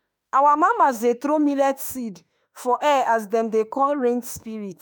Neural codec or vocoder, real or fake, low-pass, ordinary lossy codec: autoencoder, 48 kHz, 32 numbers a frame, DAC-VAE, trained on Japanese speech; fake; none; none